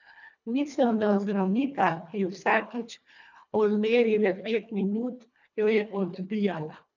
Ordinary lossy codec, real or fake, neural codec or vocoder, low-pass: none; fake; codec, 24 kHz, 1.5 kbps, HILCodec; 7.2 kHz